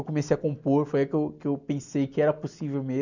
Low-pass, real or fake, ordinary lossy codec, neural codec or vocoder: 7.2 kHz; real; none; none